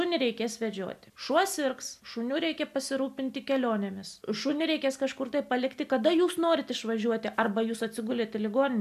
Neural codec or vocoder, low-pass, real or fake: none; 14.4 kHz; real